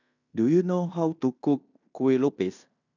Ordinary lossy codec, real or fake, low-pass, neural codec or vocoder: none; fake; 7.2 kHz; codec, 16 kHz in and 24 kHz out, 0.9 kbps, LongCat-Audio-Codec, fine tuned four codebook decoder